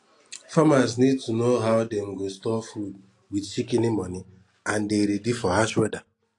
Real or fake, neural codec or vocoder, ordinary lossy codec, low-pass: real; none; AAC, 48 kbps; 10.8 kHz